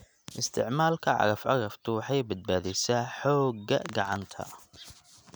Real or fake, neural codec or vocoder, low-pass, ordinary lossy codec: real; none; none; none